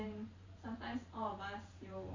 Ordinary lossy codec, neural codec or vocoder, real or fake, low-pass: AAC, 32 kbps; vocoder, 44.1 kHz, 128 mel bands every 512 samples, BigVGAN v2; fake; 7.2 kHz